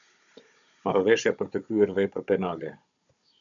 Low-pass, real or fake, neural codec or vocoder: 7.2 kHz; fake; codec, 16 kHz, 16 kbps, FunCodec, trained on Chinese and English, 50 frames a second